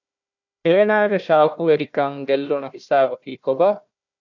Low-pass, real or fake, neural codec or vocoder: 7.2 kHz; fake; codec, 16 kHz, 1 kbps, FunCodec, trained on Chinese and English, 50 frames a second